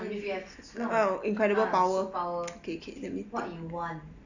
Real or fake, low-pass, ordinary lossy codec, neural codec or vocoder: real; 7.2 kHz; none; none